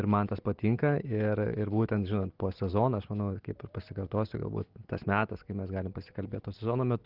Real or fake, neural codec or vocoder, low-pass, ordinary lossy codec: real; none; 5.4 kHz; Opus, 16 kbps